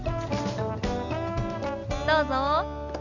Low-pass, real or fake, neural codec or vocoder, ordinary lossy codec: 7.2 kHz; real; none; none